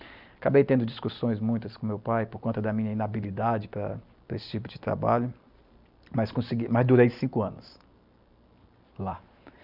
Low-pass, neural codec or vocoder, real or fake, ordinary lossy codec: 5.4 kHz; none; real; none